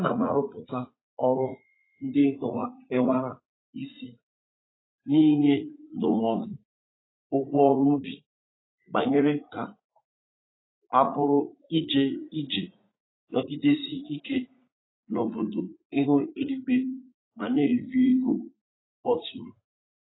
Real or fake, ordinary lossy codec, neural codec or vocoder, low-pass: fake; AAC, 16 kbps; vocoder, 44.1 kHz, 80 mel bands, Vocos; 7.2 kHz